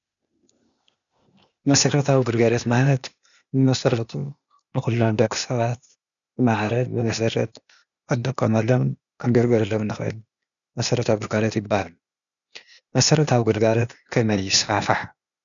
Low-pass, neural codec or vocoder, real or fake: 7.2 kHz; codec, 16 kHz, 0.8 kbps, ZipCodec; fake